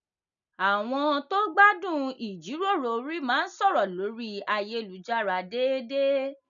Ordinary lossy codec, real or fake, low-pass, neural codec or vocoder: none; real; 7.2 kHz; none